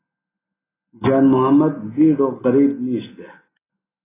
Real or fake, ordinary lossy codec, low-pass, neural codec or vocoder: real; AAC, 16 kbps; 3.6 kHz; none